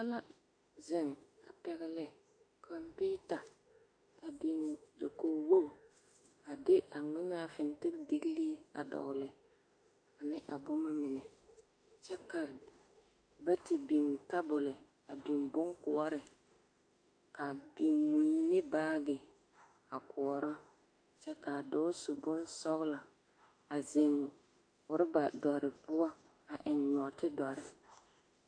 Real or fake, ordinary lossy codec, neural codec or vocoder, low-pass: fake; AAC, 48 kbps; autoencoder, 48 kHz, 32 numbers a frame, DAC-VAE, trained on Japanese speech; 9.9 kHz